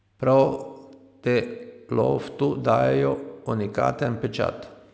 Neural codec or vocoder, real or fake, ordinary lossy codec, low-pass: none; real; none; none